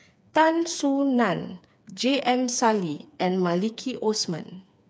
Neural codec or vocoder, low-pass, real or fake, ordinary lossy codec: codec, 16 kHz, 4 kbps, FreqCodec, smaller model; none; fake; none